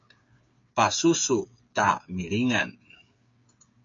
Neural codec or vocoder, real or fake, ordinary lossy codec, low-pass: codec, 16 kHz, 8 kbps, FreqCodec, smaller model; fake; MP3, 48 kbps; 7.2 kHz